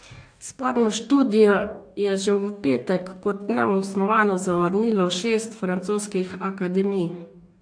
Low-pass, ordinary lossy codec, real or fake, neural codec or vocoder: 9.9 kHz; AAC, 64 kbps; fake; codec, 44.1 kHz, 2.6 kbps, DAC